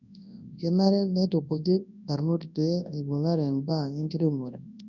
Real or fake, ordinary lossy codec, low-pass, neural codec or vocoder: fake; none; 7.2 kHz; codec, 24 kHz, 0.9 kbps, WavTokenizer, large speech release